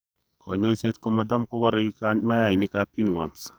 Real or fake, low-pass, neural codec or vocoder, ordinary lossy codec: fake; none; codec, 44.1 kHz, 2.6 kbps, SNAC; none